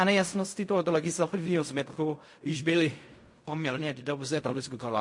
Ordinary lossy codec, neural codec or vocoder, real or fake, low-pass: MP3, 48 kbps; codec, 16 kHz in and 24 kHz out, 0.4 kbps, LongCat-Audio-Codec, fine tuned four codebook decoder; fake; 10.8 kHz